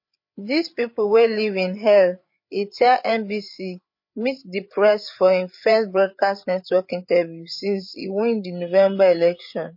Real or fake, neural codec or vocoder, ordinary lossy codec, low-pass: fake; codec, 16 kHz, 8 kbps, FreqCodec, larger model; MP3, 32 kbps; 5.4 kHz